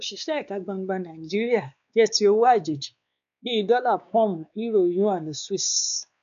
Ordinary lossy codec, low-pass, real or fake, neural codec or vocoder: none; 7.2 kHz; fake; codec, 16 kHz, 2 kbps, X-Codec, WavLM features, trained on Multilingual LibriSpeech